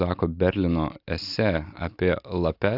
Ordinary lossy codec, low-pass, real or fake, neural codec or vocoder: AAC, 48 kbps; 5.4 kHz; real; none